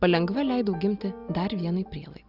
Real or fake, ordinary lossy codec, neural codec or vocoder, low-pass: real; Opus, 64 kbps; none; 5.4 kHz